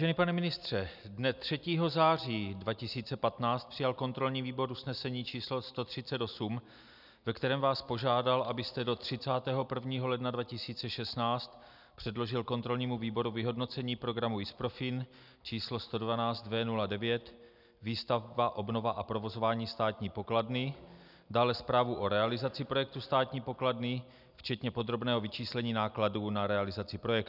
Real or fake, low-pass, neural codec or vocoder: real; 5.4 kHz; none